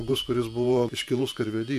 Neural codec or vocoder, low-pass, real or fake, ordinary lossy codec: vocoder, 48 kHz, 128 mel bands, Vocos; 14.4 kHz; fake; MP3, 96 kbps